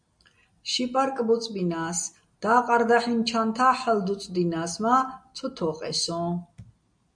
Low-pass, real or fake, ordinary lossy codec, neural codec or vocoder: 9.9 kHz; real; MP3, 64 kbps; none